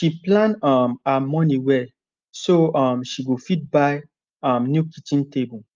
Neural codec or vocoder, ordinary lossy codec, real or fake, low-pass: none; Opus, 24 kbps; real; 7.2 kHz